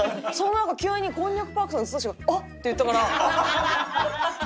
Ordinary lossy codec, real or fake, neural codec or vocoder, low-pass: none; real; none; none